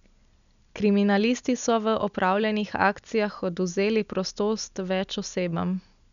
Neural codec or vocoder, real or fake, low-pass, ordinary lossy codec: none; real; 7.2 kHz; none